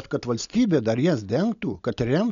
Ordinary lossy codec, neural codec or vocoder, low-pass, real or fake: MP3, 96 kbps; none; 7.2 kHz; real